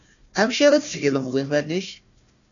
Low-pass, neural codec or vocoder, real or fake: 7.2 kHz; codec, 16 kHz, 1 kbps, FunCodec, trained on Chinese and English, 50 frames a second; fake